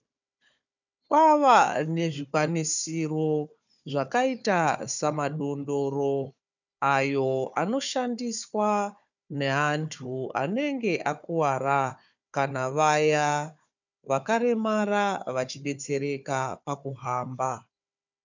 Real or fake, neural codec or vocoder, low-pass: fake; codec, 16 kHz, 4 kbps, FunCodec, trained on Chinese and English, 50 frames a second; 7.2 kHz